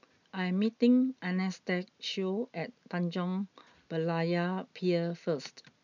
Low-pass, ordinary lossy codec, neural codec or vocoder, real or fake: 7.2 kHz; none; none; real